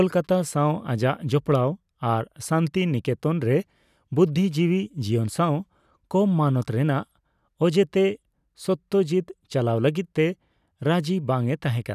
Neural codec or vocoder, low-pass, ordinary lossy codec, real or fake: none; 14.4 kHz; none; real